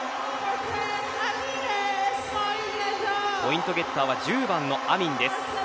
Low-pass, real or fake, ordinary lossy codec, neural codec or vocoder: none; real; none; none